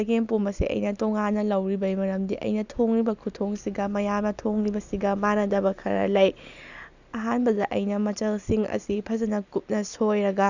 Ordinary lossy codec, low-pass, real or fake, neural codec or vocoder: none; 7.2 kHz; real; none